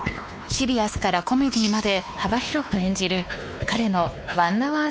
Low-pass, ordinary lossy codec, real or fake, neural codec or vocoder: none; none; fake; codec, 16 kHz, 2 kbps, X-Codec, WavLM features, trained on Multilingual LibriSpeech